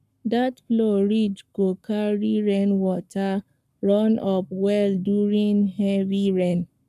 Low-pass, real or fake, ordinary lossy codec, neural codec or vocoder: 14.4 kHz; fake; none; codec, 44.1 kHz, 7.8 kbps, Pupu-Codec